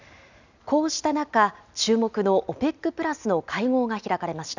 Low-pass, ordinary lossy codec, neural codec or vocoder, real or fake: 7.2 kHz; none; none; real